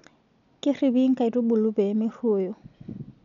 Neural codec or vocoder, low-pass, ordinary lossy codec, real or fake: none; 7.2 kHz; none; real